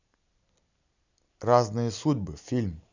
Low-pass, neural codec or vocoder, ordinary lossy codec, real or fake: 7.2 kHz; none; none; real